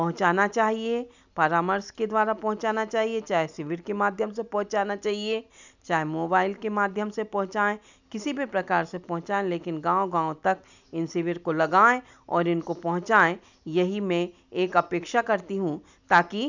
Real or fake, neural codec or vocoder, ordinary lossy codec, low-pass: real; none; none; 7.2 kHz